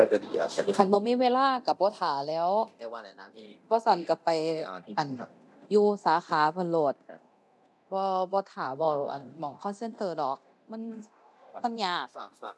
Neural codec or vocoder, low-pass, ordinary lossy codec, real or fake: codec, 24 kHz, 0.9 kbps, DualCodec; none; none; fake